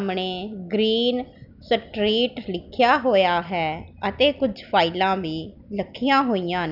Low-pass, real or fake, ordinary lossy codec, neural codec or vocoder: 5.4 kHz; real; none; none